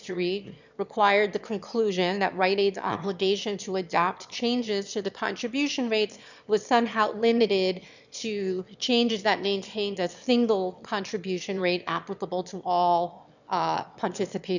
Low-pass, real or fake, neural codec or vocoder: 7.2 kHz; fake; autoencoder, 22.05 kHz, a latent of 192 numbers a frame, VITS, trained on one speaker